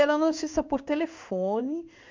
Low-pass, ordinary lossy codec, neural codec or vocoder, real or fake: 7.2 kHz; MP3, 64 kbps; codec, 16 kHz in and 24 kHz out, 1 kbps, XY-Tokenizer; fake